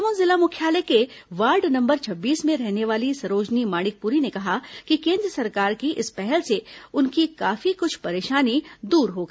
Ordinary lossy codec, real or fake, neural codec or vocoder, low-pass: none; real; none; none